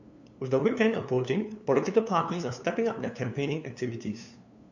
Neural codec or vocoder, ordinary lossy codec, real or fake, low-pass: codec, 16 kHz, 2 kbps, FunCodec, trained on LibriTTS, 25 frames a second; none; fake; 7.2 kHz